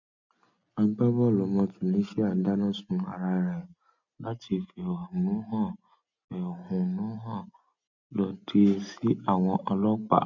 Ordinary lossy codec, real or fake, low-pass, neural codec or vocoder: none; real; 7.2 kHz; none